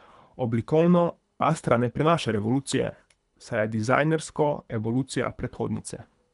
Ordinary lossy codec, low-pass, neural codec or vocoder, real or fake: none; 10.8 kHz; codec, 24 kHz, 3 kbps, HILCodec; fake